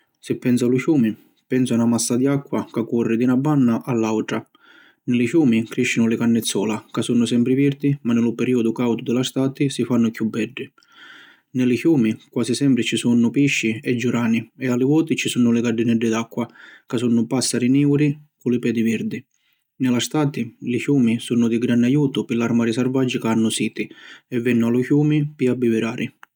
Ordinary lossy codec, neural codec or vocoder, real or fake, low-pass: none; none; real; 19.8 kHz